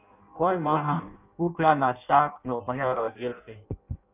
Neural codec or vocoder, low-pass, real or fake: codec, 16 kHz in and 24 kHz out, 0.6 kbps, FireRedTTS-2 codec; 3.6 kHz; fake